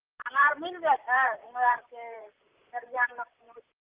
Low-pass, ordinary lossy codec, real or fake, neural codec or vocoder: 3.6 kHz; Opus, 24 kbps; fake; vocoder, 44.1 kHz, 128 mel bands, Pupu-Vocoder